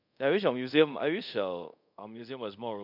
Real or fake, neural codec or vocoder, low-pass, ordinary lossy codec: fake; codec, 24 kHz, 0.5 kbps, DualCodec; 5.4 kHz; none